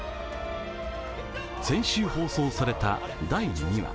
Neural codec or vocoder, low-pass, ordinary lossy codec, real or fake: none; none; none; real